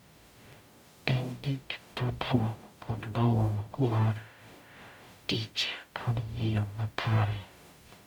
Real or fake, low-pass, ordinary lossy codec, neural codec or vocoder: fake; 19.8 kHz; none; codec, 44.1 kHz, 0.9 kbps, DAC